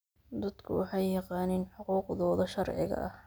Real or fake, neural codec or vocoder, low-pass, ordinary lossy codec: real; none; none; none